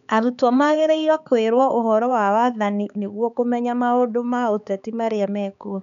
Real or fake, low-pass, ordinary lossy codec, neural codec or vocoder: fake; 7.2 kHz; none; codec, 16 kHz, 4 kbps, X-Codec, HuBERT features, trained on balanced general audio